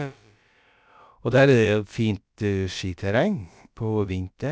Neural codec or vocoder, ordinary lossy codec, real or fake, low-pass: codec, 16 kHz, about 1 kbps, DyCAST, with the encoder's durations; none; fake; none